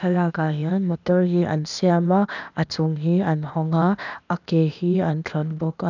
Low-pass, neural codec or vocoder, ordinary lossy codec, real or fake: 7.2 kHz; codec, 16 kHz, 0.8 kbps, ZipCodec; none; fake